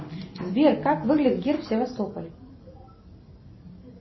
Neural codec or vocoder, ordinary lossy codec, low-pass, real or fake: none; MP3, 24 kbps; 7.2 kHz; real